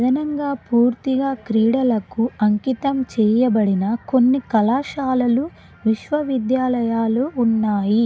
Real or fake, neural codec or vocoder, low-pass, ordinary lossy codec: real; none; none; none